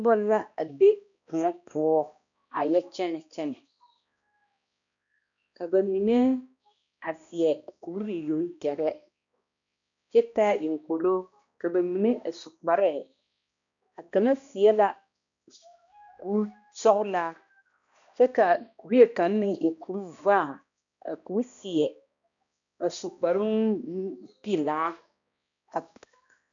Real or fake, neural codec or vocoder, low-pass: fake; codec, 16 kHz, 1 kbps, X-Codec, HuBERT features, trained on balanced general audio; 7.2 kHz